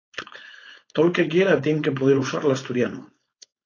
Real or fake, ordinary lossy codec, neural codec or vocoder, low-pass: fake; AAC, 32 kbps; codec, 16 kHz, 4.8 kbps, FACodec; 7.2 kHz